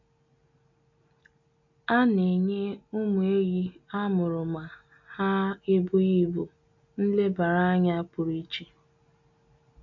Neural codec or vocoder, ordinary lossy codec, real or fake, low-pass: none; MP3, 64 kbps; real; 7.2 kHz